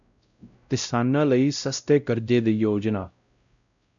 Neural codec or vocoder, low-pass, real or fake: codec, 16 kHz, 0.5 kbps, X-Codec, WavLM features, trained on Multilingual LibriSpeech; 7.2 kHz; fake